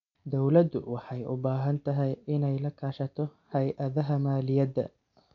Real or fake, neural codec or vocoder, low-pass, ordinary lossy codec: real; none; 7.2 kHz; MP3, 96 kbps